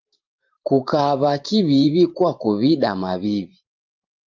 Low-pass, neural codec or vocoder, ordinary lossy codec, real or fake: 7.2 kHz; vocoder, 44.1 kHz, 128 mel bands every 512 samples, BigVGAN v2; Opus, 24 kbps; fake